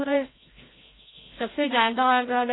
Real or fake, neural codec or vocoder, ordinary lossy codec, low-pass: fake; codec, 16 kHz, 0.5 kbps, FreqCodec, larger model; AAC, 16 kbps; 7.2 kHz